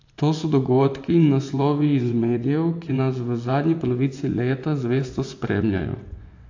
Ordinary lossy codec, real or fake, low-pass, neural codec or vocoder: AAC, 48 kbps; fake; 7.2 kHz; vocoder, 24 kHz, 100 mel bands, Vocos